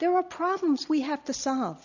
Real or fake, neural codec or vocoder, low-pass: real; none; 7.2 kHz